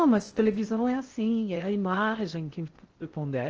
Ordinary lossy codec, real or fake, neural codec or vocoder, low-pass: Opus, 16 kbps; fake; codec, 16 kHz in and 24 kHz out, 0.6 kbps, FocalCodec, streaming, 2048 codes; 7.2 kHz